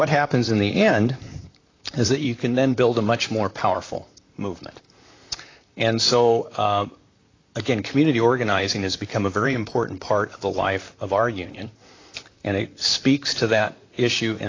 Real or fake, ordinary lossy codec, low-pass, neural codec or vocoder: fake; AAC, 32 kbps; 7.2 kHz; vocoder, 44.1 kHz, 128 mel bands, Pupu-Vocoder